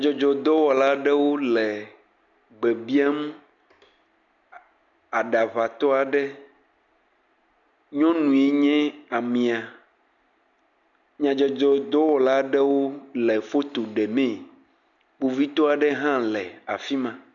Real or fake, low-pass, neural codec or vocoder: real; 7.2 kHz; none